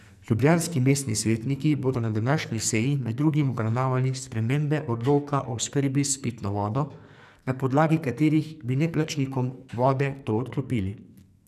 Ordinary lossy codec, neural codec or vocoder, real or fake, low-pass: none; codec, 44.1 kHz, 2.6 kbps, SNAC; fake; 14.4 kHz